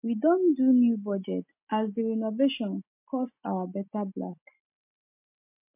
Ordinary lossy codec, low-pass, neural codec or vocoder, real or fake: MP3, 32 kbps; 3.6 kHz; none; real